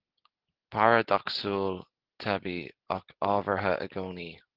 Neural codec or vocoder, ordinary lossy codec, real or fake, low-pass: none; Opus, 16 kbps; real; 5.4 kHz